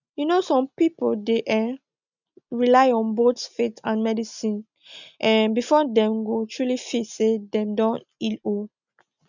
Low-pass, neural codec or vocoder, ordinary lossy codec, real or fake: 7.2 kHz; none; none; real